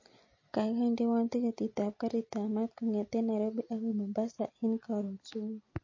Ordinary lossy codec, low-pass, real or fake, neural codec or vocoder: MP3, 32 kbps; 7.2 kHz; real; none